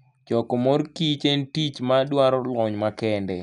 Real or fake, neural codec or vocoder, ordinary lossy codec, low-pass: real; none; none; 10.8 kHz